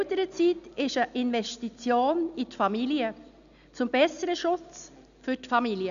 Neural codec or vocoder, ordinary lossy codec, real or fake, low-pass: none; none; real; 7.2 kHz